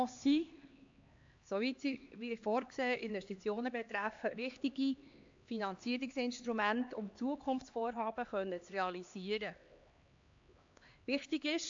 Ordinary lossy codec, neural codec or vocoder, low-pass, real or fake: AAC, 64 kbps; codec, 16 kHz, 2 kbps, X-Codec, HuBERT features, trained on LibriSpeech; 7.2 kHz; fake